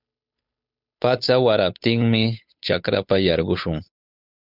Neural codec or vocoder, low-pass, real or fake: codec, 16 kHz, 8 kbps, FunCodec, trained on Chinese and English, 25 frames a second; 5.4 kHz; fake